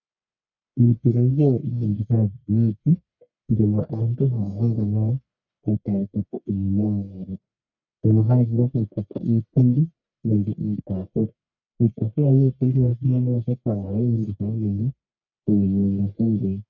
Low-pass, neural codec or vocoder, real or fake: 7.2 kHz; codec, 44.1 kHz, 1.7 kbps, Pupu-Codec; fake